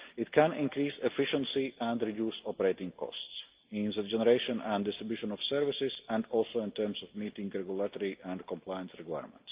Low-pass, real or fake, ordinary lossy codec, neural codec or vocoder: 3.6 kHz; real; Opus, 32 kbps; none